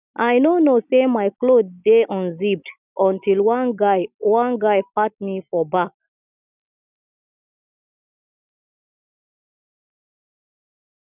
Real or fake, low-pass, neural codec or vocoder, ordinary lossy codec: real; 3.6 kHz; none; none